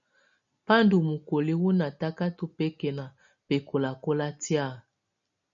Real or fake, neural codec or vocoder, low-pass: real; none; 7.2 kHz